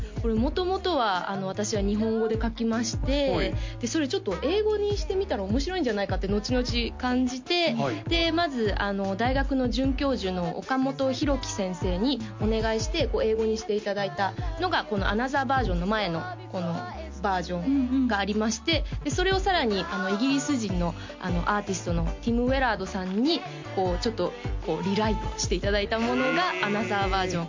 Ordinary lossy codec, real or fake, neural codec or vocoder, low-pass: MP3, 48 kbps; real; none; 7.2 kHz